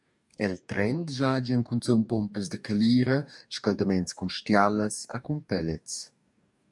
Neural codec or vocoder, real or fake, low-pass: codec, 44.1 kHz, 2.6 kbps, DAC; fake; 10.8 kHz